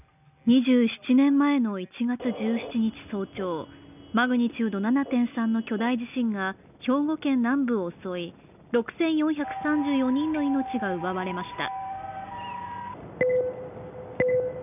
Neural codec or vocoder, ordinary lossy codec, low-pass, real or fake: none; none; 3.6 kHz; real